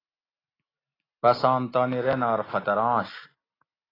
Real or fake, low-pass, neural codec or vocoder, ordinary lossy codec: real; 5.4 kHz; none; AAC, 24 kbps